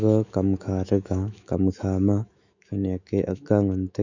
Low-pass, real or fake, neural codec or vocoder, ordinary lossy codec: 7.2 kHz; fake; autoencoder, 48 kHz, 128 numbers a frame, DAC-VAE, trained on Japanese speech; none